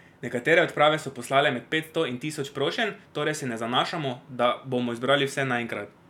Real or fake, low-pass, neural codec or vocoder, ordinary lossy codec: real; 19.8 kHz; none; none